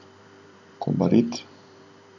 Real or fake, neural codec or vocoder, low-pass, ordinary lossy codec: fake; vocoder, 44.1 kHz, 128 mel bands every 512 samples, BigVGAN v2; 7.2 kHz; none